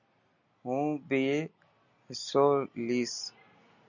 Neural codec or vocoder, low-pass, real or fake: none; 7.2 kHz; real